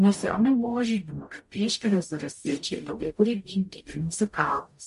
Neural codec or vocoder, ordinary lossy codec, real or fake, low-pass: codec, 44.1 kHz, 0.9 kbps, DAC; MP3, 48 kbps; fake; 14.4 kHz